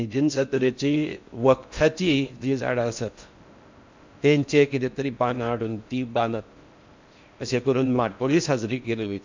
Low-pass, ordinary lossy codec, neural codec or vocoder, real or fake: 7.2 kHz; MP3, 64 kbps; codec, 16 kHz in and 24 kHz out, 0.6 kbps, FocalCodec, streaming, 4096 codes; fake